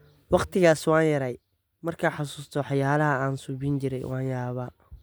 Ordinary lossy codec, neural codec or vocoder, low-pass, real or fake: none; none; none; real